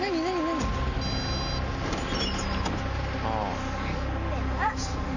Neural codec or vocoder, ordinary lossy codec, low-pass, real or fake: none; none; 7.2 kHz; real